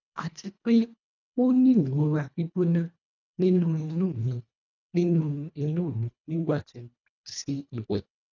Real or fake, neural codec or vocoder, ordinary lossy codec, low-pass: fake; codec, 24 kHz, 1.5 kbps, HILCodec; none; 7.2 kHz